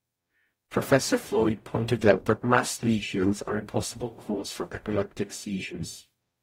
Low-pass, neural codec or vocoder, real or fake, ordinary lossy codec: 19.8 kHz; codec, 44.1 kHz, 0.9 kbps, DAC; fake; AAC, 48 kbps